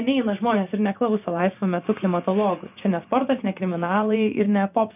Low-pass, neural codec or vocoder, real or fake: 3.6 kHz; vocoder, 24 kHz, 100 mel bands, Vocos; fake